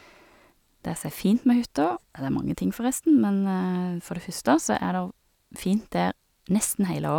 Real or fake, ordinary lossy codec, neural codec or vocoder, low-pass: real; none; none; 19.8 kHz